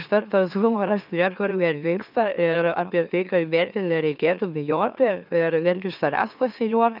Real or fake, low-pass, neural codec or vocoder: fake; 5.4 kHz; autoencoder, 44.1 kHz, a latent of 192 numbers a frame, MeloTTS